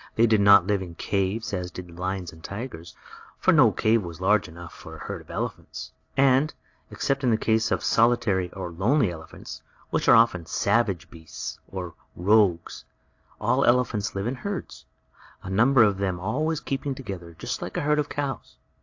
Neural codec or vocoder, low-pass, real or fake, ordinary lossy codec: none; 7.2 kHz; real; AAC, 48 kbps